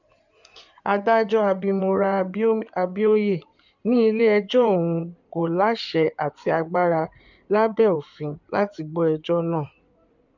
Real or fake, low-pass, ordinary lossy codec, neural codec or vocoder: fake; 7.2 kHz; none; codec, 16 kHz in and 24 kHz out, 2.2 kbps, FireRedTTS-2 codec